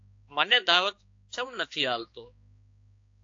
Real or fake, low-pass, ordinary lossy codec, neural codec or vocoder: fake; 7.2 kHz; AAC, 48 kbps; codec, 16 kHz, 2 kbps, X-Codec, HuBERT features, trained on balanced general audio